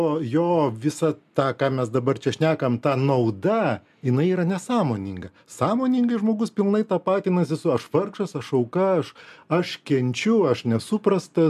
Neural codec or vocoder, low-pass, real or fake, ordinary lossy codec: none; 14.4 kHz; real; MP3, 96 kbps